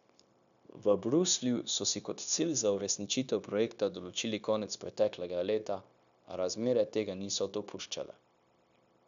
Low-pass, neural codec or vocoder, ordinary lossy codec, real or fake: 7.2 kHz; codec, 16 kHz, 0.9 kbps, LongCat-Audio-Codec; none; fake